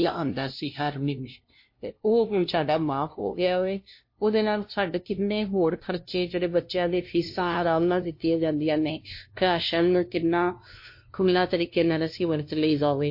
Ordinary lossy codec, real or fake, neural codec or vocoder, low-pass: MP3, 32 kbps; fake; codec, 16 kHz, 0.5 kbps, FunCodec, trained on LibriTTS, 25 frames a second; 5.4 kHz